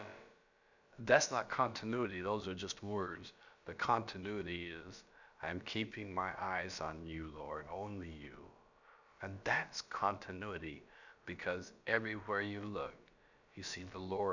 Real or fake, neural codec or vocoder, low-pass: fake; codec, 16 kHz, about 1 kbps, DyCAST, with the encoder's durations; 7.2 kHz